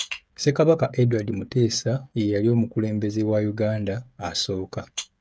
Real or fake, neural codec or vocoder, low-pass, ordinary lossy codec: fake; codec, 16 kHz, 16 kbps, FreqCodec, smaller model; none; none